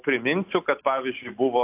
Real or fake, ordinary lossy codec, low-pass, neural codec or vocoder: real; AAC, 32 kbps; 3.6 kHz; none